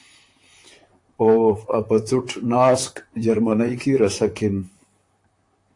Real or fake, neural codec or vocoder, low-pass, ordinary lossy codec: fake; vocoder, 44.1 kHz, 128 mel bands, Pupu-Vocoder; 10.8 kHz; AAC, 48 kbps